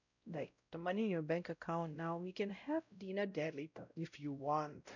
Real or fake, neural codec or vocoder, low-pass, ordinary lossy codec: fake; codec, 16 kHz, 0.5 kbps, X-Codec, WavLM features, trained on Multilingual LibriSpeech; 7.2 kHz; none